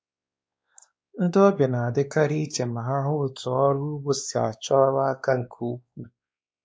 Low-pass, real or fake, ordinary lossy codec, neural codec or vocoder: none; fake; none; codec, 16 kHz, 2 kbps, X-Codec, WavLM features, trained on Multilingual LibriSpeech